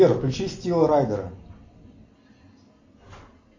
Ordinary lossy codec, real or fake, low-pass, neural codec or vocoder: MP3, 48 kbps; real; 7.2 kHz; none